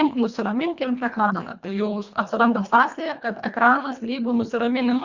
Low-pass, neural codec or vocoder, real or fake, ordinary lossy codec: 7.2 kHz; codec, 24 kHz, 1.5 kbps, HILCodec; fake; Opus, 64 kbps